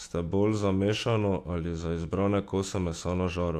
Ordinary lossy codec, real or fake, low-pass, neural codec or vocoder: AAC, 64 kbps; fake; 14.4 kHz; autoencoder, 48 kHz, 128 numbers a frame, DAC-VAE, trained on Japanese speech